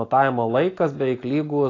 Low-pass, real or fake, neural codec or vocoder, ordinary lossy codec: 7.2 kHz; real; none; AAC, 32 kbps